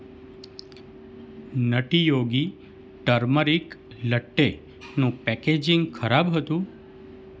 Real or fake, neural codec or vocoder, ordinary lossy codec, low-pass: real; none; none; none